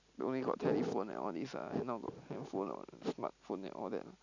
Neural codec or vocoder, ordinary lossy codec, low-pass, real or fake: none; none; 7.2 kHz; real